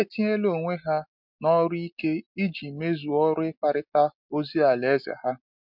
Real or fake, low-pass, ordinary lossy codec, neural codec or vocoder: real; 5.4 kHz; MP3, 48 kbps; none